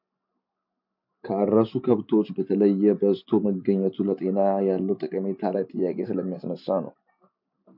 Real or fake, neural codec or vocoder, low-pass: real; none; 5.4 kHz